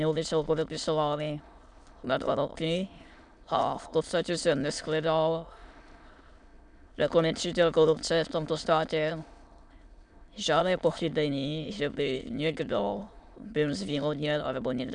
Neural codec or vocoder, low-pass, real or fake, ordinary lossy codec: autoencoder, 22.05 kHz, a latent of 192 numbers a frame, VITS, trained on many speakers; 9.9 kHz; fake; MP3, 96 kbps